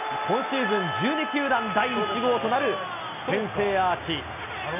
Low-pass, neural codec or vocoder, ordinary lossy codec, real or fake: 3.6 kHz; none; none; real